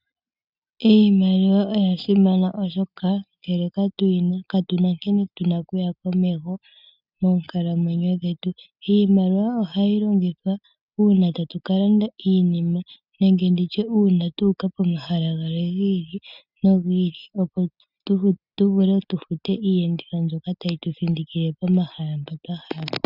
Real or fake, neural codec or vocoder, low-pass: real; none; 5.4 kHz